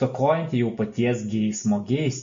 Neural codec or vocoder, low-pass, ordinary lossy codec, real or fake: none; 7.2 kHz; MP3, 48 kbps; real